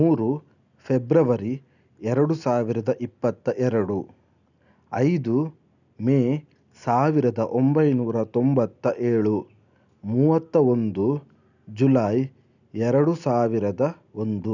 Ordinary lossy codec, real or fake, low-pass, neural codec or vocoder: none; real; 7.2 kHz; none